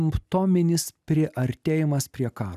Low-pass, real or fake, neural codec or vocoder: 14.4 kHz; real; none